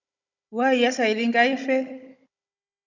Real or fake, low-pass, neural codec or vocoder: fake; 7.2 kHz; codec, 16 kHz, 16 kbps, FunCodec, trained on Chinese and English, 50 frames a second